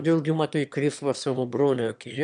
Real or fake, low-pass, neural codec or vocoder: fake; 9.9 kHz; autoencoder, 22.05 kHz, a latent of 192 numbers a frame, VITS, trained on one speaker